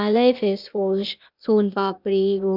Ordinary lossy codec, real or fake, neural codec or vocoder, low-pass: none; fake; codec, 16 kHz, 0.8 kbps, ZipCodec; 5.4 kHz